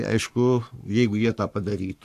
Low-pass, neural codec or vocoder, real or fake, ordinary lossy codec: 14.4 kHz; codec, 44.1 kHz, 7.8 kbps, Pupu-Codec; fake; AAC, 64 kbps